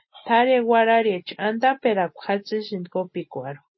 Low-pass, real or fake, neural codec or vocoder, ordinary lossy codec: 7.2 kHz; real; none; MP3, 24 kbps